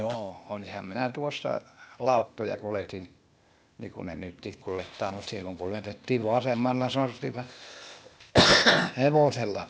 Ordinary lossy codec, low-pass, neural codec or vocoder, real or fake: none; none; codec, 16 kHz, 0.8 kbps, ZipCodec; fake